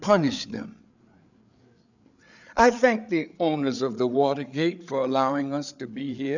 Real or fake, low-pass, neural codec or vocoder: fake; 7.2 kHz; codec, 16 kHz, 8 kbps, FreqCodec, larger model